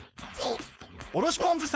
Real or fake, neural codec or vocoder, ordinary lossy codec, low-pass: fake; codec, 16 kHz, 4.8 kbps, FACodec; none; none